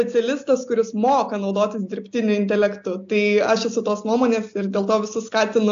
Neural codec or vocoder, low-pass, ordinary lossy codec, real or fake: none; 7.2 kHz; AAC, 64 kbps; real